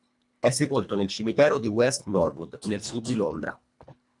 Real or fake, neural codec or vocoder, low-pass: fake; codec, 24 kHz, 1.5 kbps, HILCodec; 10.8 kHz